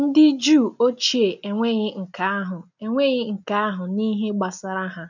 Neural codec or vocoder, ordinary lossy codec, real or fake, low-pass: none; none; real; 7.2 kHz